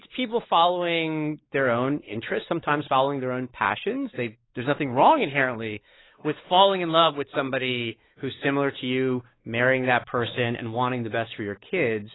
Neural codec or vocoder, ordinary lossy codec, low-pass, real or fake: codec, 16 kHz, 4 kbps, X-Codec, WavLM features, trained on Multilingual LibriSpeech; AAC, 16 kbps; 7.2 kHz; fake